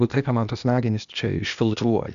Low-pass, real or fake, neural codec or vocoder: 7.2 kHz; fake; codec, 16 kHz, 0.8 kbps, ZipCodec